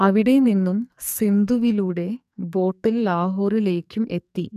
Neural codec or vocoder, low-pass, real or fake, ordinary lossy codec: codec, 44.1 kHz, 2.6 kbps, SNAC; 14.4 kHz; fake; none